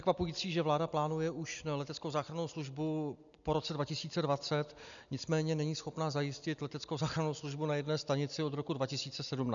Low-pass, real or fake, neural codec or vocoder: 7.2 kHz; real; none